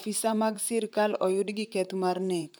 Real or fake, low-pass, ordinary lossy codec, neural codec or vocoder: fake; none; none; vocoder, 44.1 kHz, 128 mel bands, Pupu-Vocoder